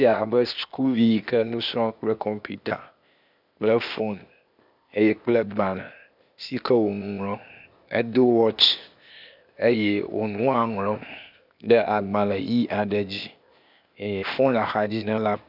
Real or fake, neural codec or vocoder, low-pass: fake; codec, 16 kHz, 0.8 kbps, ZipCodec; 5.4 kHz